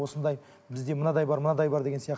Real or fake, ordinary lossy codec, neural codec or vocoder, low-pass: real; none; none; none